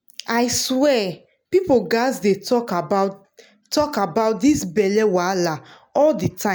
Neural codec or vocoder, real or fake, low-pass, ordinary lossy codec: none; real; none; none